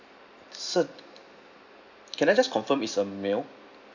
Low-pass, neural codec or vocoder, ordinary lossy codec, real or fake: 7.2 kHz; none; none; real